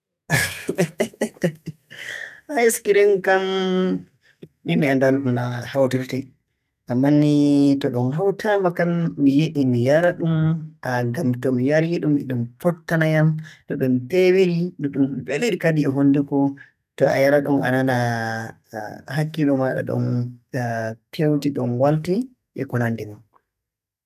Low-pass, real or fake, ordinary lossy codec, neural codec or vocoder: 14.4 kHz; fake; none; codec, 32 kHz, 1.9 kbps, SNAC